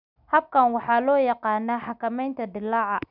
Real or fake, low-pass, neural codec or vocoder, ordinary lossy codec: real; 5.4 kHz; none; none